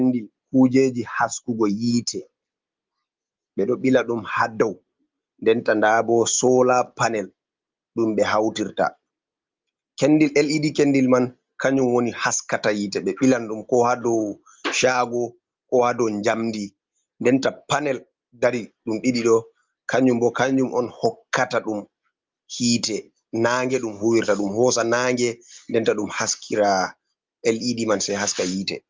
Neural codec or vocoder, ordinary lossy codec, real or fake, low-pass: none; Opus, 32 kbps; real; 7.2 kHz